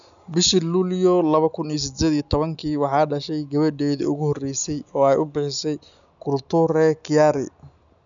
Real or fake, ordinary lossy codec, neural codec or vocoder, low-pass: real; none; none; 7.2 kHz